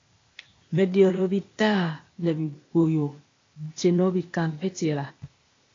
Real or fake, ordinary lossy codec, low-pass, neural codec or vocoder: fake; AAC, 32 kbps; 7.2 kHz; codec, 16 kHz, 0.8 kbps, ZipCodec